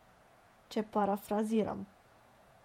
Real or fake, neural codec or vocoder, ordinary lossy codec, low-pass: fake; vocoder, 48 kHz, 128 mel bands, Vocos; MP3, 64 kbps; 19.8 kHz